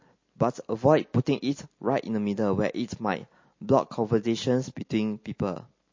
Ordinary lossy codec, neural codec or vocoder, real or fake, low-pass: MP3, 32 kbps; none; real; 7.2 kHz